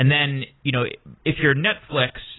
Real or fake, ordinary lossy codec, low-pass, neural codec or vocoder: real; AAC, 16 kbps; 7.2 kHz; none